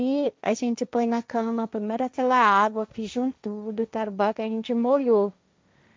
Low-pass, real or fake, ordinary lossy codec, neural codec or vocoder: none; fake; none; codec, 16 kHz, 1.1 kbps, Voila-Tokenizer